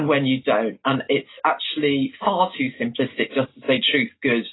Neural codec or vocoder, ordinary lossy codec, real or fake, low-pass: none; AAC, 16 kbps; real; 7.2 kHz